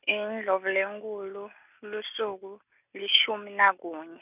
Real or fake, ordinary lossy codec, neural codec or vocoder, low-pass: real; none; none; 3.6 kHz